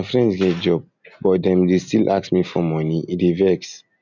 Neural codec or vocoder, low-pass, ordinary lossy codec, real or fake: none; 7.2 kHz; none; real